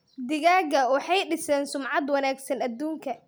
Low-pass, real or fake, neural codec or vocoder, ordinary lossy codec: none; real; none; none